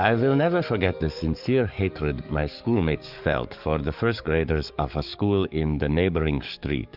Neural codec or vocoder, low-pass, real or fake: codec, 44.1 kHz, 7.8 kbps, DAC; 5.4 kHz; fake